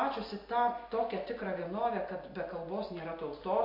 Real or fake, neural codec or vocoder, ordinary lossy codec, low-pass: real; none; MP3, 32 kbps; 5.4 kHz